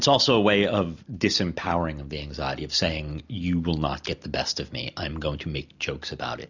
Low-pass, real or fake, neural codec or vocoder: 7.2 kHz; real; none